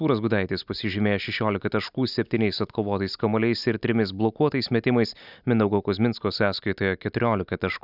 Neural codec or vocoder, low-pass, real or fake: none; 5.4 kHz; real